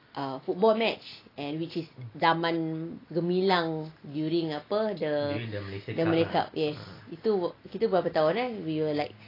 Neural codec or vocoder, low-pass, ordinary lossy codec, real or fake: none; 5.4 kHz; AAC, 24 kbps; real